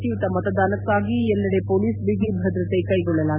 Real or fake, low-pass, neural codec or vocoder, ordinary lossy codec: real; 3.6 kHz; none; none